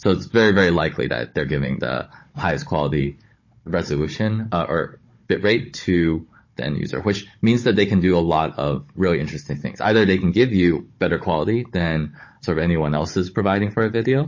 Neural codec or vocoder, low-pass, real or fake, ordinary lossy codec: codec, 16 kHz, 16 kbps, FunCodec, trained on Chinese and English, 50 frames a second; 7.2 kHz; fake; MP3, 32 kbps